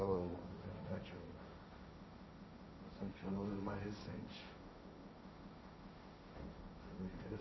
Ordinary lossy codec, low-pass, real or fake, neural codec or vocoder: MP3, 24 kbps; 7.2 kHz; fake; codec, 16 kHz, 1.1 kbps, Voila-Tokenizer